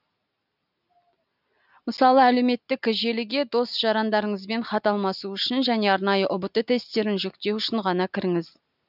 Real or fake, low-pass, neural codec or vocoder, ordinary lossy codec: real; 5.4 kHz; none; none